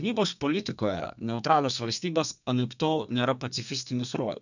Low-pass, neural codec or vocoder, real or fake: 7.2 kHz; codec, 32 kHz, 1.9 kbps, SNAC; fake